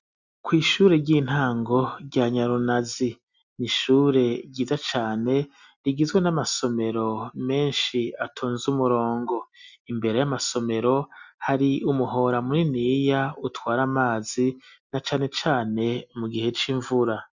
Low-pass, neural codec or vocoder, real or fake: 7.2 kHz; none; real